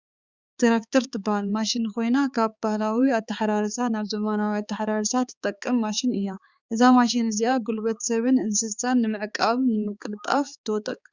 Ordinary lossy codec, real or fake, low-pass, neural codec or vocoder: Opus, 64 kbps; fake; 7.2 kHz; codec, 16 kHz, 4 kbps, X-Codec, HuBERT features, trained on balanced general audio